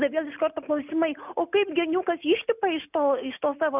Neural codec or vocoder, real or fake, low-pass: none; real; 3.6 kHz